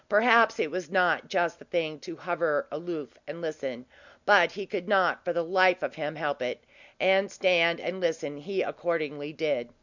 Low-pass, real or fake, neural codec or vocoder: 7.2 kHz; real; none